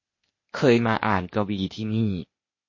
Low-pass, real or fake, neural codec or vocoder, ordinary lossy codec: 7.2 kHz; fake; codec, 16 kHz, 0.8 kbps, ZipCodec; MP3, 32 kbps